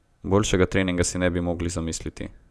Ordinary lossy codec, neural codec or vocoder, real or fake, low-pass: none; none; real; none